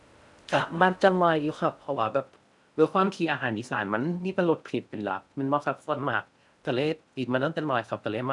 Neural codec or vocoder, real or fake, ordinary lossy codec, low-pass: codec, 16 kHz in and 24 kHz out, 0.6 kbps, FocalCodec, streaming, 4096 codes; fake; none; 10.8 kHz